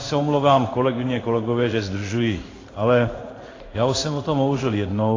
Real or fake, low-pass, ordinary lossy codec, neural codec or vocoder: fake; 7.2 kHz; AAC, 32 kbps; codec, 16 kHz in and 24 kHz out, 1 kbps, XY-Tokenizer